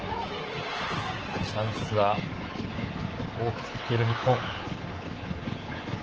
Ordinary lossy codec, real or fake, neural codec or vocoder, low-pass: Opus, 16 kbps; fake; codec, 24 kHz, 3.1 kbps, DualCodec; 7.2 kHz